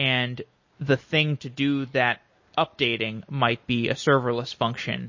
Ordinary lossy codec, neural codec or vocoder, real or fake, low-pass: MP3, 32 kbps; none; real; 7.2 kHz